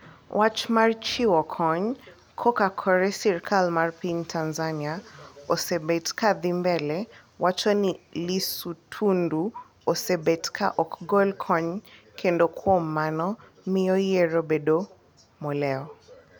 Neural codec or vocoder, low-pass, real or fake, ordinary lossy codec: none; none; real; none